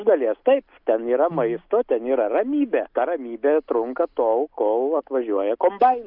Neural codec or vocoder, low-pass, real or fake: none; 5.4 kHz; real